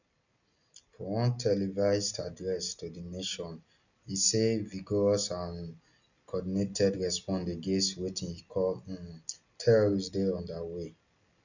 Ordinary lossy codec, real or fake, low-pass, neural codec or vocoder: none; real; 7.2 kHz; none